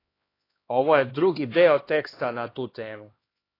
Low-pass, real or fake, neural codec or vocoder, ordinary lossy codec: 5.4 kHz; fake; codec, 16 kHz, 2 kbps, X-Codec, HuBERT features, trained on LibriSpeech; AAC, 24 kbps